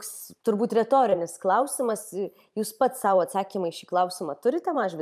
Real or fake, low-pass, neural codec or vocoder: fake; 14.4 kHz; vocoder, 44.1 kHz, 128 mel bands every 512 samples, BigVGAN v2